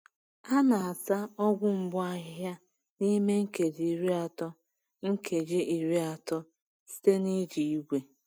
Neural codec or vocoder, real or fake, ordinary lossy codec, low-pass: none; real; none; none